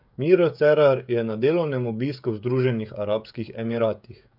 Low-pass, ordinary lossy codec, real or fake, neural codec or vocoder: 5.4 kHz; none; fake; codec, 16 kHz, 16 kbps, FreqCodec, smaller model